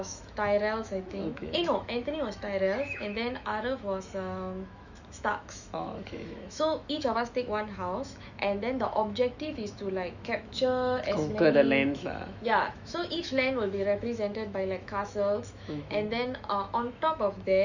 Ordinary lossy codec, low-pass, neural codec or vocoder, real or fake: none; 7.2 kHz; autoencoder, 48 kHz, 128 numbers a frame, DAC-VAE, trained on Japanese speech; fake